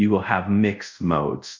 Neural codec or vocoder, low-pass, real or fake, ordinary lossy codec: codec, 24 kHz, 0.5 kbps, DualCodec; 7.2 kHz; fake; MP3, 64 kbps